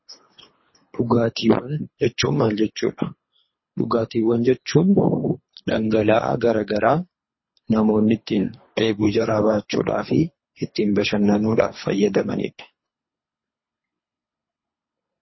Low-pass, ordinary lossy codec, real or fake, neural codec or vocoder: 7.2 kHz; MP3, 24 kbps; fake; codec, 24 kHz, 3 kbps, HILCodec